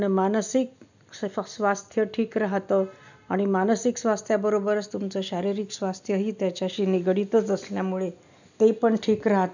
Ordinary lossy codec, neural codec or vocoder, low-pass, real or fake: none; none; 7.2 kHz; real